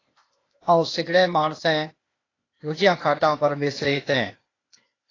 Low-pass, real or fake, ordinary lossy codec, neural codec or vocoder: 7.2 kHz; fake; AAC, 32 kbps; codec, 16 kHz, 0.8 kbps, ZipCodec